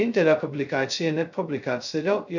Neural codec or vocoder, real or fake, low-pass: codec, 16 kHz, 0.2 kbps, FocalCodec; fake; 7.2 kHz